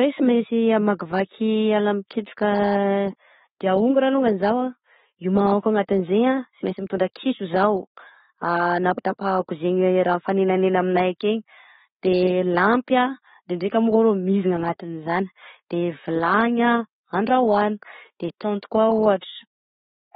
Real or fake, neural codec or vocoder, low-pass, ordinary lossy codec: real; none; 19.8 kHz; AAC, 16 kbps